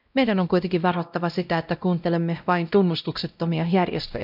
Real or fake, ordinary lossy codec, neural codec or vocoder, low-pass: fake; none; codec, 16 kHz, 0.5 kbps, X-Codec, WavLM features, trained on Multilingual LibriSpeech; 5.4 kHz